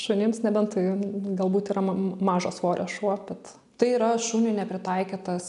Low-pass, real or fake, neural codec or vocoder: 10.8 kHz; real; none